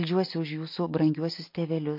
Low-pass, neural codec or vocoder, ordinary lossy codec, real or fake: 5.4 kHz; none; MP3, 32 kbps; real